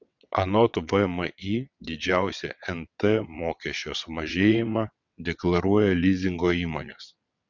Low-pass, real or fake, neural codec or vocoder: 7.2 kHz; fake; vocoder, 22.05 kHz, 80 mel bands, WaveNeXt